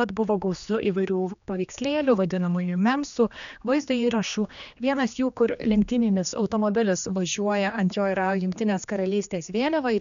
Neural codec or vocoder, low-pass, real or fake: codec, 16 kHz, 2 kbps, X-Codec, HuBERT features, trained on general audio; 7.2 kHz; fake